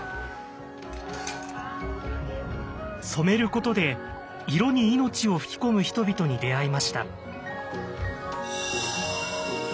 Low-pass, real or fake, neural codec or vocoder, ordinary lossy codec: none; real; none; none